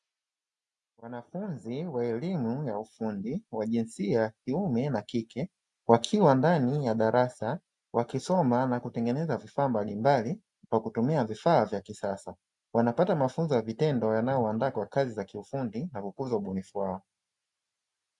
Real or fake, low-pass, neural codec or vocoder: real; 10.8 kHz; none